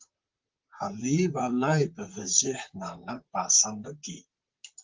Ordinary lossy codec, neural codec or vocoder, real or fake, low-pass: Opus, 24 kbps; vocoder, 44.1 kHz, 128 mel bands, Pupu-Vocoder; fake; 7.2 kHz